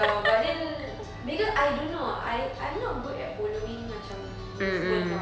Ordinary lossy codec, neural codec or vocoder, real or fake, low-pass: none; none; real; none